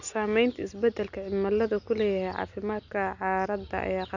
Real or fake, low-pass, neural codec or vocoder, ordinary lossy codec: real; 7.2 kHz; none; none